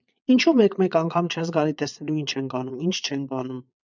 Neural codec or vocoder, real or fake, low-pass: vocoder, 22.05 kHz, 80 mel bands, Vocos; fake; 7.2 kHz